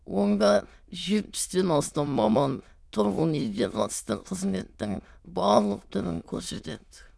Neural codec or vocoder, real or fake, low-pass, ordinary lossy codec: autoencoder, 22.05 kHz, a latent of 192 numbers a frame, VITS, trained on many speakers; fake; none; none